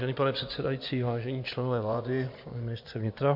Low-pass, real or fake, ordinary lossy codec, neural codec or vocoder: 5.4 kHz; fake; MP3, 48 kbps; vocoder, 24 kHz, 100 mel bands, Vocos